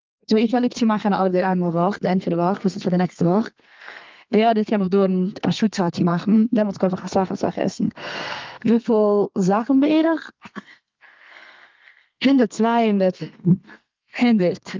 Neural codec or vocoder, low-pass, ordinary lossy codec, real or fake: codec, 32 kHz, 1.9 kbps, SNAC; 7.2 kHz; Opus, 24 kbps; fake